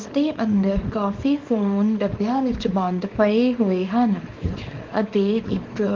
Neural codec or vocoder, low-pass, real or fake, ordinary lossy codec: codec, 24 kHz, 0.9 kbps, WavTokenizer, small release; 7.2 kHz; fake; Opus, 32 kbps